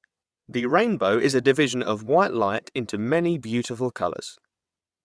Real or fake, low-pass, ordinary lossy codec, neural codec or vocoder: fake; none; none; vocoder, 22.05 kHz, 80 mel bands, WaveNeXt